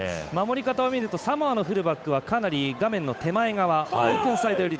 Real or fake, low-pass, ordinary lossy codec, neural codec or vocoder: fake; none; none; codec, 16 kHz, 8 kbps, FunCodec, trained on Chinese and English, 25 frames a second